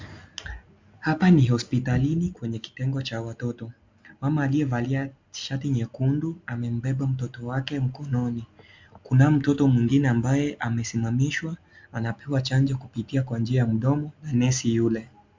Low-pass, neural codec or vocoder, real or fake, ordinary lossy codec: 7.2 kHz; none; real; MP3, 64 kbps